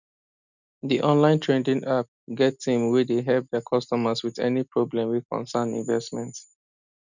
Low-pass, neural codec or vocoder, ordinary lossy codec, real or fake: 7.2 kHz; none; none; real